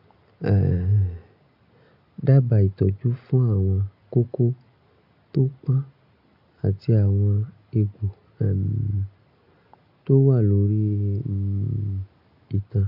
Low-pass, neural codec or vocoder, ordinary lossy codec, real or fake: 5.4 kHz; none; none; real